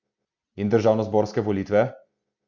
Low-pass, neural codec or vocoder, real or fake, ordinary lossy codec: 7.2 kHz; none; real; none